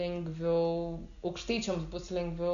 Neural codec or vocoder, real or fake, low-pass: none; real; 7.2 kHz